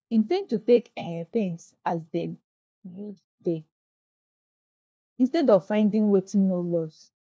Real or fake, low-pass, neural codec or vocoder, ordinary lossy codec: fake; none; codec, 16 kHz, 1 kbps, FunCodec, trained on LibriTTS, 50 frames a second; none